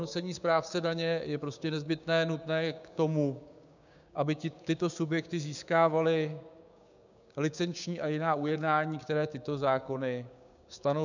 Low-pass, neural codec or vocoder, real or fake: 7.2 kHz; codec, 16 kHz, 6 kbps, DAC; fake